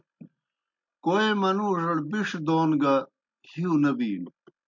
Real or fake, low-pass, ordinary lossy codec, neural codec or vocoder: real; 7.2 kHz; MP3, 64 kbps; none